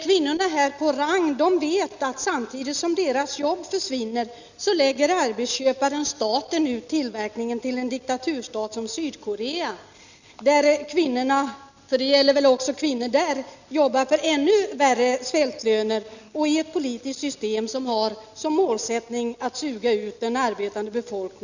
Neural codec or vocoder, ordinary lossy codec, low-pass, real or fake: none; none; 7.2 kHz; real